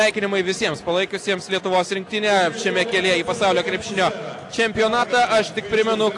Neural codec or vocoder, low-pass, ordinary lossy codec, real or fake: none; 10.8 kHz; AAC, 48 kbps; real